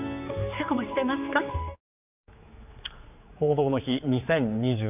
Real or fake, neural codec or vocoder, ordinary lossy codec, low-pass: fake; codec, 16 kHz, 4 kbps, X-Codec, HuBERT features, trained on general audio; none; 3.6 kHz